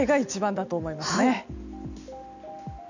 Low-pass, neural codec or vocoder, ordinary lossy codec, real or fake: 7.2 kHz; none; none; real